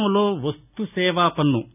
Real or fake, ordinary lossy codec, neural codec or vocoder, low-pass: real; none; none; 3.6 kHz